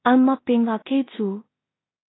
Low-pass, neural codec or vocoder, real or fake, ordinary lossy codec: 7.2 kHz; codec, 16 kHz in and 24 kHz out, 0.4 kbps, LongCat-Audio-Codec, two codebook decoder; fake; AAC, 16 kbps